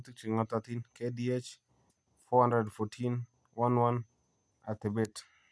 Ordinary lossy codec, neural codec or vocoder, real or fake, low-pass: none; none; real; none